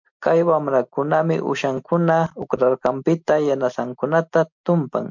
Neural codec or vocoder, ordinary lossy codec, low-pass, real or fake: none; MP3, 64 kbps; 7.2 kHz; real